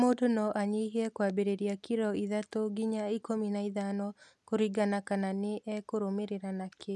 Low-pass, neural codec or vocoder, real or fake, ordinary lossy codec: none; none; real; none